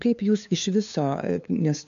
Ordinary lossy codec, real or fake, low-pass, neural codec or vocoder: AAC, 64 kbps; fake; 7.2 kHz; codec, 16 kHz, 2 kbps, FunCodec, trained on LibriTTS, 25 frames a second